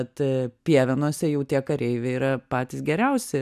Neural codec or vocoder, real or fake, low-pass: none; real; 14.4 kHz